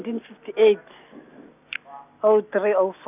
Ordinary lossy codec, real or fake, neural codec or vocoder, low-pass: none; real; none; 3.6 kHz